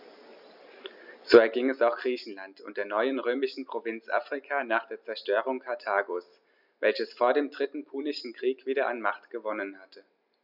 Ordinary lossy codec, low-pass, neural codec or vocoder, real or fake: none; 5.4 kHz; none; real